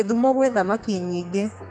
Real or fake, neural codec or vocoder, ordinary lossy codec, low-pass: fake; codec, 32 kHz, 1.9 kbps, SNAC; none; 9.9 kHz